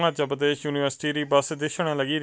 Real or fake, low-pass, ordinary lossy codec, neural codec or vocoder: real; none; none; none